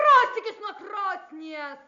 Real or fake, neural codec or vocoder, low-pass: real; none; 7.2 kHz